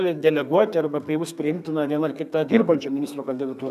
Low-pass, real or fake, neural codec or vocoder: 14.4 kHz; fake; codec, 32 kHz, 1.9 kbps, SNAC